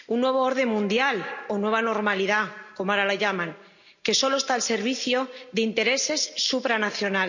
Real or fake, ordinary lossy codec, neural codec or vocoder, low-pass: real; none; none; 7.2 kHz